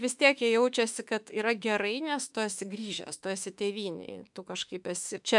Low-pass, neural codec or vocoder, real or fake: 10.8 kHz; autoencoder, 48 kHz, 32 numbers a frame, DAC-VAE, trained on Japanese speech; fake